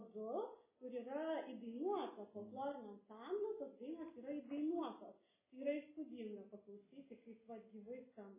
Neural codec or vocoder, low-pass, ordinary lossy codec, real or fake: none; 3.6 kHz; MP3, 16 kbps; real